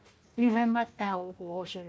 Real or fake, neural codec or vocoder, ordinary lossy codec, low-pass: fake; codec, 16 kHz, 1 kbps, FunCodec, trained on Chinese and English, 50 frames a second; none; none